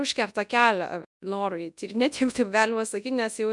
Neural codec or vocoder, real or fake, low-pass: codec, 24 kHz, 0.9 kbps, WavTokenizer, large speech release; fake; 10.8 kHz